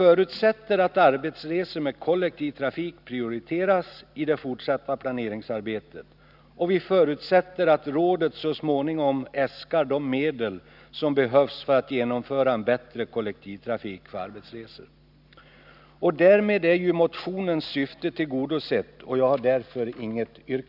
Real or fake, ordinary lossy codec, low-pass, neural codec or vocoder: real; none; 5.4 kHz; none